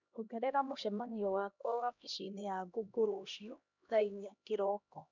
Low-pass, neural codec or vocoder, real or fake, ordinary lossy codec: 7.2 kHz; codec, 16 kHz, 1 kbps, X-Codec, HuBERT features, trained on LibriSpeech; fake; none